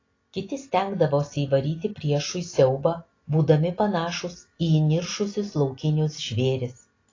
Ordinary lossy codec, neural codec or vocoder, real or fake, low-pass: AAC, 32 kbps; none; real; 7.2 kHz